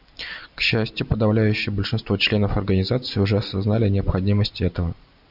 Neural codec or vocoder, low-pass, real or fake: none; 5.4 kHz; real